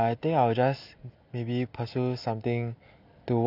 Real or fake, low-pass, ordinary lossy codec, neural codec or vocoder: real; 5.4 kHz; none; none